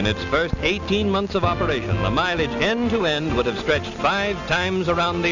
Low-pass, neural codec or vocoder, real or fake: 7.2 kHz; none; real